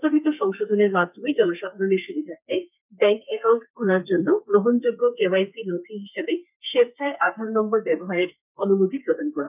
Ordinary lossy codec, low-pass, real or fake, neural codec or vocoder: none; 3.6 kHz; fake; codec, 32 kHz, 1.9 kbps, SNAC